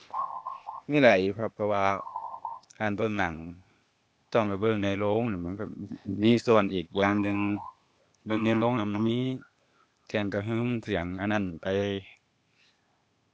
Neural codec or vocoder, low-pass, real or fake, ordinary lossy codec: codec, 16 kHz, 0.8 kbps, ZipCodec; none; fake; none